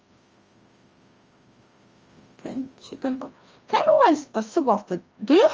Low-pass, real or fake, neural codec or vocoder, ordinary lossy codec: 7.2 kHz; fake; codec, 16 kHz, 1 kbps, FreqCodec, larger model; Opus, 24 kbps